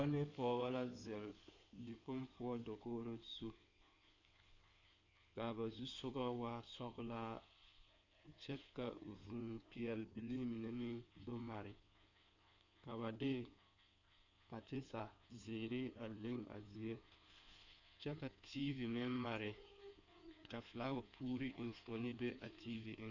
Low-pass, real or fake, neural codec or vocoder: 7.2 kHz; fake; codec, 16 kHz in and 24 kHz out, 2.2 kbps, FireRedTTS-2 codec